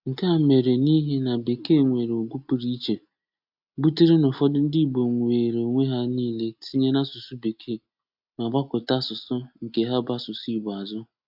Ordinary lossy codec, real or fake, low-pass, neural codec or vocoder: AAC, 48 kbps; real; 5.4 kHz; none